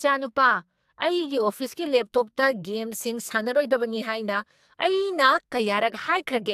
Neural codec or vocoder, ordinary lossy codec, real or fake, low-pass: codec, 44.1 kHz, 2.6 kbps, SNAC; AAC, 96 kbps; fake; 14.4 kHz